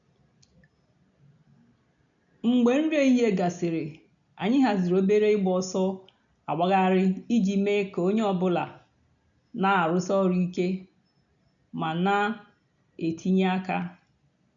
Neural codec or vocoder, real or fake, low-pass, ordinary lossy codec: none; real; 7.2 kHz; none